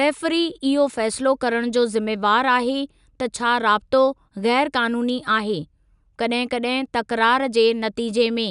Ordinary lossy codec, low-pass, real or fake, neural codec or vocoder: none; 10.8 kHz; real; none